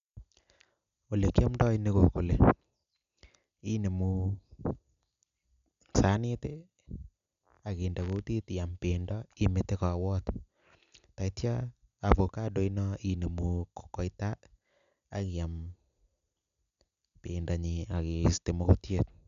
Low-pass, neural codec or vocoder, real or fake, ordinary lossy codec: 7.2 kHz; none; real; none